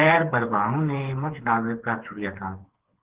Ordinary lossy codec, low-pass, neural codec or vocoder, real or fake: Opus, 16 kbps; 3.6 kHz; codec, 16 kHz, 4 kbps, FreqCodec, smaller model; fake